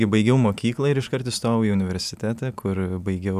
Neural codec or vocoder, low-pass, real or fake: none; 14.4 kHz; real